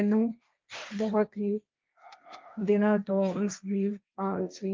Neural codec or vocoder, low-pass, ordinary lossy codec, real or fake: codec, 16 kHz, 1.1 kbps, Voila-Tokenizer; 7.2 kHz; Opus, 24 kbps; fake